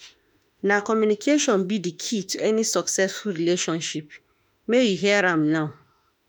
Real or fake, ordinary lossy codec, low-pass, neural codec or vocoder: fake; none; none; autoencoder, 48 kHz, 32 numbers a frame, DAC-VAE, trained on Japanese speech